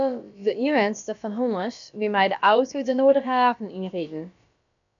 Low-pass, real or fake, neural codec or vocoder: 7.2 kHz; fake; codec, 16 kHz, about 1 kbps, DyCAST, with the encoder's durations